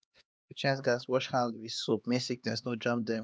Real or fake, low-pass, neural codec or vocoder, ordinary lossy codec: fake; none; codec, 16 kHz, 2 kbps, X-Codec, HuBERT features, trained on LibriSpeech; none